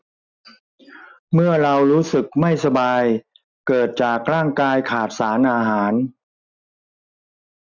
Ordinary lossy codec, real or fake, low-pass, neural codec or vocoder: none; real; 7.2 kHz; none